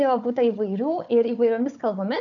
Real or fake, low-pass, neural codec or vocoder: fake; 7.2 kHz; codec, 16 kHz, 4 kbps, FunCodec, trained on Chinese and English, 50 frames a second